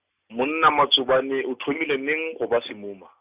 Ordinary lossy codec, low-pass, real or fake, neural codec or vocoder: Opus, 64 kbps; 3.6 kHz; real; none